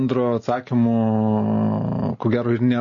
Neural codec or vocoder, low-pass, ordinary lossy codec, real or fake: none; 7.2 kHz; MP3, 32 kbps; real